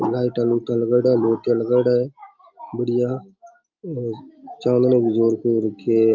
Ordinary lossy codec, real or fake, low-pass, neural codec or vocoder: Opus, 24 kbps; real; 7.2 kHz; none